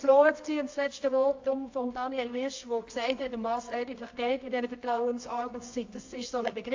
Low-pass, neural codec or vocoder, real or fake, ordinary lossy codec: 7.2 kHz; codec, 24 kHz, 0.9 kbps, WavTokenizer, medium music audio release; fake; AAC, 48 kbps